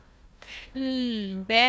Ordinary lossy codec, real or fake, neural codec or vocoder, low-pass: none; fake; codec, 16 kHz, 1 kbps, FunCodec, trained on Chinese and English, 50 frames a second; none